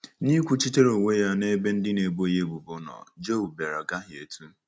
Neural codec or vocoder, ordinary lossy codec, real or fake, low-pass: none; none; real; none